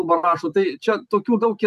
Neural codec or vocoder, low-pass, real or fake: none; 14.4 kHz; real